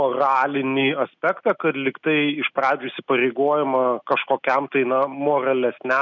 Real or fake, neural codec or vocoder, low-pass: real; none; 7.2 kHz